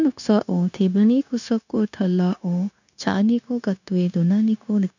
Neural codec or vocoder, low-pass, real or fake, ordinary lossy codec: codec, 16 kHz, 0.9 kbps, LongCat-Audio-Codec; 7.2 kHz; fake; none